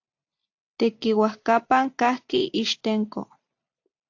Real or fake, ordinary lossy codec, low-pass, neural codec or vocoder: real; AAC, 48 kbps; 7.2 kHz; none